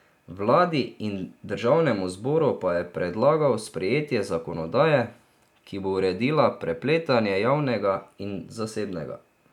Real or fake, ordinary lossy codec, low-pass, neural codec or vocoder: real; none; 19.8 kHz; none